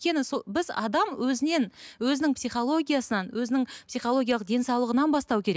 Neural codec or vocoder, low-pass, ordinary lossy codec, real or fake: none; none; none; real